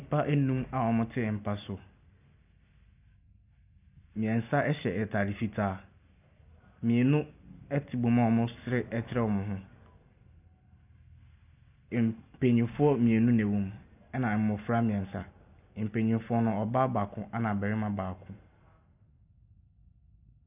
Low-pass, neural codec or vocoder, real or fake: 3.6 kHz; none; real